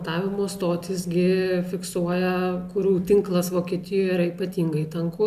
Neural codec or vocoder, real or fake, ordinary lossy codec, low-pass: none; real; MP3, 96 kbps; 14.4 kHz